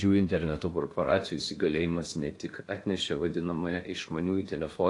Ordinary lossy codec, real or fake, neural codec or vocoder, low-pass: AAC, 48 kbps; fake; codec, 16 kHz in and 24 kHz out, 0.8 kbps, FocalCodec, streaming, 65536 codes; 10.8 kHz